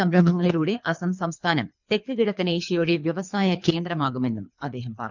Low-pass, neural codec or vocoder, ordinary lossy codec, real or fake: 7.2 kHz; codec, 24 kHz, 3 kbps, HILCodec; none; fake